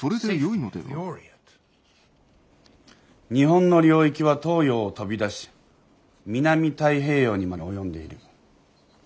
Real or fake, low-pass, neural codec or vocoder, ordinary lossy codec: real; none; none; none